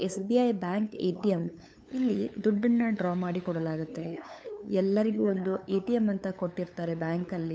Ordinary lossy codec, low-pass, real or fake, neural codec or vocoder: none; none; fake; codec, 16 kHz, 8 kbps, FunCodec, trained on LibriTTS, 25 frames a second